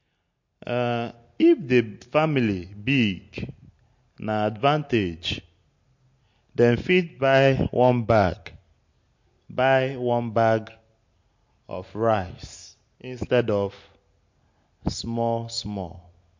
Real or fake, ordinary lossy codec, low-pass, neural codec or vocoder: real; MP3, 48 kbps; 7.2 kHz; none